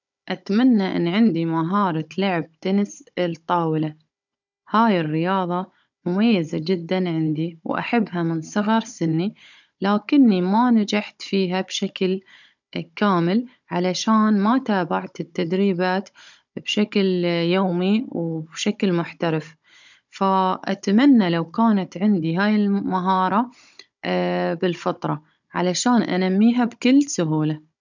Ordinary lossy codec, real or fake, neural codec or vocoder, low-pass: none; fake; codec, 16 kHz, 16 kbps, FunCodec, trained on Chinese and English, 50 frames a second; 7.2 kHz